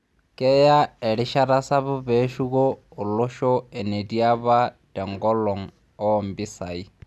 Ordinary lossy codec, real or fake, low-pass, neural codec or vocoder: none; real; none; none